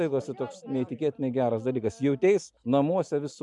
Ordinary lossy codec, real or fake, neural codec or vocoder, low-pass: MP3, 96 kbps; real; none; 10.8 kHz